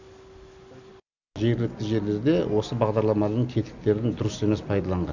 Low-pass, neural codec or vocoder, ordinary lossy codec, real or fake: 7.2 kHz; none; none; real